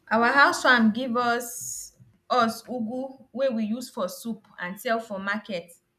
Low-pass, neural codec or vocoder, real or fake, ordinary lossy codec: 14.4 kHz; none; real; none